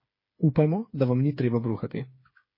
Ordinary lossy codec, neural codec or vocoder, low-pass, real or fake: MP3, 24 kbps; codec, 16 kHz, 8 kbps, FreqCodec, smaller model; 5.4 kHz; fake